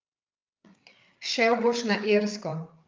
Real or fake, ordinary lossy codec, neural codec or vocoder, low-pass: fake; Opus, 24 kbps; codec, 16 kHz, 8 kbps, FreqCodec, larger model; 7.2 kHz